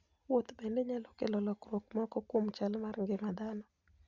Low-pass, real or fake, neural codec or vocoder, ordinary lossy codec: 7.2 kHz; real; none; Opus, 64 kbps